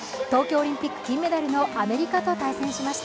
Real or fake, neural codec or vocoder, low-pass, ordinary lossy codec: real; none; none; none